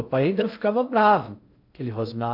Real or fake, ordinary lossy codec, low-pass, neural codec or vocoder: fake; AAC, 48 kbps; 5.4 kHz; codec, 16 kHz in and 24 kHz out, 0.6 kbps, FocalCodec, streaming, 2048 codes